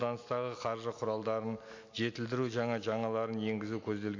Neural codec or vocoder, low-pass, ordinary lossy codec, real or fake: none; 7.2 kHz; MP3, 48 kbps; real